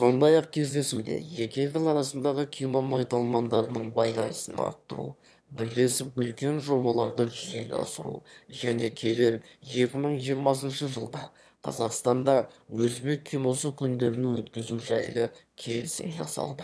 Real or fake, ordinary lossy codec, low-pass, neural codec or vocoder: fake; none; none; autoencoder, 22.05 kHz, a latent of 192 numbers a frame, VITS, trained on one speaker